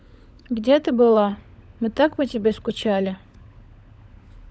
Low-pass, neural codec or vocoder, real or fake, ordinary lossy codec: none; codec, 16 kHz, 16 kbps, FunCodec, trained on LibriTTS, 50 frames a second; fake; none